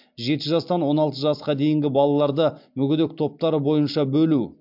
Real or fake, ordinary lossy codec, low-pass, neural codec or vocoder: real; MP3, 48 kbps; 5.4 kHz; none